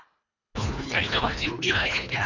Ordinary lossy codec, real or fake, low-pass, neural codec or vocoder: none; fake; 7.2 kHz; codec, 24 kHz, 1.5 kbps, HILCodec